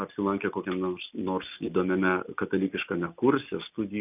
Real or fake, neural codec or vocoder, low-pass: real; none; 3.6 kHz